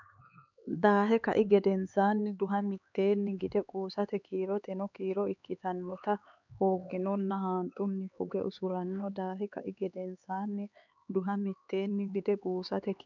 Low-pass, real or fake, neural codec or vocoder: 7.2 kHz; fake; codec, 16 kHz, 4 kbps, X-Codec, HuBERT features, trained on LibriSpeech